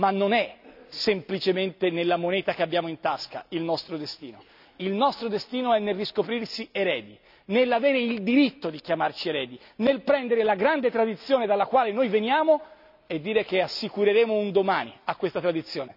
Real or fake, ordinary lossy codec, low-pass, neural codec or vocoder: real; none; 5.4 kHz; none